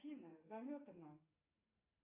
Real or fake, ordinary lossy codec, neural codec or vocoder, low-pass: fake; AAC, 24 kbps; codec, 44.1 kHz, 2.6 kbps, SNAC; 3.6 kHz